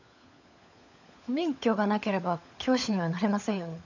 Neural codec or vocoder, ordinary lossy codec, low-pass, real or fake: codec, 16 kHz, 16 kbps, FunCodec, trained on LibriTTS, 50 frames a second; none; 7.2 kHz; fake